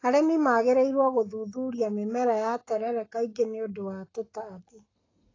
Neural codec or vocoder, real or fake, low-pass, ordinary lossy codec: codec, 44.1 kHz, 7.8 kbps, Pupu-Codec; fake; 7.2 kHz; AAC, 32 kbps